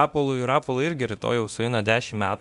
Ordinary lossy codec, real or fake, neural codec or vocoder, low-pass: MP3, 96 kbps; fake; codec, 24 kHz, 0.9 kbps, DualCodec; 10.8 kHz